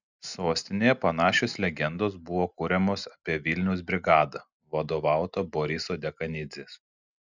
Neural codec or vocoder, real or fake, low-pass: none; real; 7.2 kHz